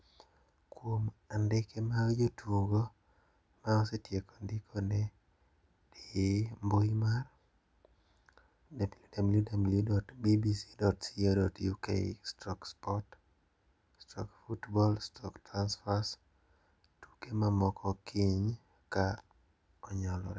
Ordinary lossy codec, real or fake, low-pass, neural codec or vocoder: none; real; none; none